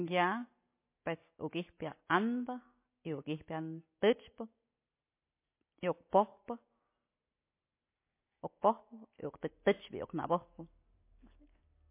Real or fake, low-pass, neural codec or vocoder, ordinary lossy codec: real; 3.6 kHz; none; MP3, 24 kbps